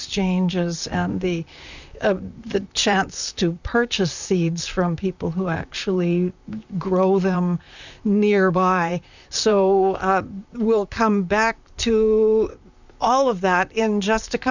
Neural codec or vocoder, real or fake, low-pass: vocoder, 44.1 kHz, 128 mel bands, Pupu-Vocoder; fake; 7.2 kHz